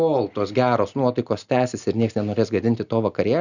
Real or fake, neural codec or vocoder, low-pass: real; none; 7.2 kHz